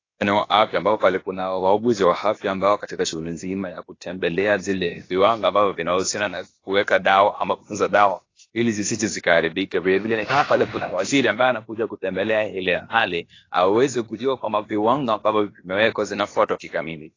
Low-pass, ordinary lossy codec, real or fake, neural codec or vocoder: 7.2 kHz; AAC, 32 kbps; fake; codec, 16 kHz, about 1 kbps, DyCAST, with the encoder's durations